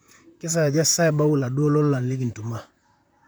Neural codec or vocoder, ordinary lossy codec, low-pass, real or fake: vocoder, 44.1 kHz, 128 mel bands, Pupu-Vocoder; none; none; fake